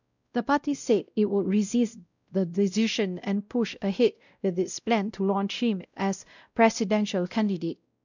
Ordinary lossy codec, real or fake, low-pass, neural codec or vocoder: none; fake; 7.2 kHz; codec, 16 kHz, 0.5 kbps, X-Codec, WavLM features, trained on Multilingual LibriSpeech